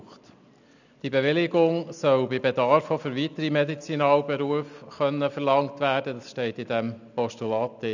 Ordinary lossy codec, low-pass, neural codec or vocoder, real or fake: none; 7.2 kHz; none; real